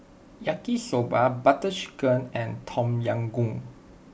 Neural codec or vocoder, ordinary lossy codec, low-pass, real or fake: none; none; none; real